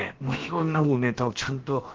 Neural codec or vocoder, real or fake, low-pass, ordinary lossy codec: codec, 16 kHz, about 1 kbps, DyCAST, with the encoder's durations; fake; 7.2 kHz; Opus, 16 kbps